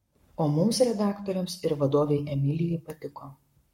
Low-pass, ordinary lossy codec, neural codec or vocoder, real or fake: 19.8 kHz; MP3, 64 kbps; codec, 44.1 kHz, 7.8 kbps, Pupu-Codec; fake